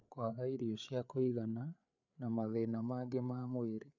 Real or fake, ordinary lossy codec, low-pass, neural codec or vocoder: fake; none; 7.2 kHz; codec, 16 kHz, 8 kbps, FreqCodec, larger model